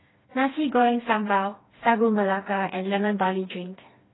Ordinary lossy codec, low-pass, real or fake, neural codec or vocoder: AAC, 16 kbps; 7.2 kHz; fake; codec, 16 kHz, 2 kbps, FreqCodec, smaller model